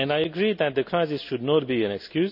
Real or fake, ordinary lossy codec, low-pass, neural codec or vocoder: real; none; 5.4 kHz; none